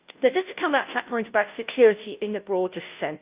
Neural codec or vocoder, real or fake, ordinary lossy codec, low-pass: codec, 16 kHz, 0.5 kbps, FunCodec, trained on Chinese and English, 25 frames a second; fake; Opus, 24 kbps; 3.6 kHz